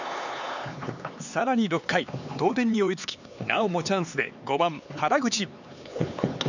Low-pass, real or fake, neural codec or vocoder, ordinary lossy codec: 7.2 kHz; fake; codec, 16 kHz, 4 kbps, X-Codec, HuBERT features, trained on LibriSpeech; none